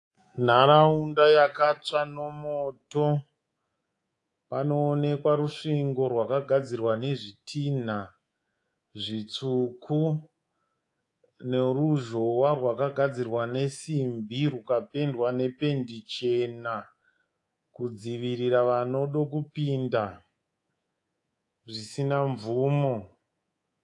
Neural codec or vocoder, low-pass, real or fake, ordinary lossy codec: codec, 24 kHz, 3.1 kbps, DualCodec; 10.8 kHz; fake; AAC, 48 kbps